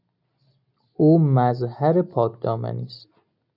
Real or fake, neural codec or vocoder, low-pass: real; none; 5.4 kHz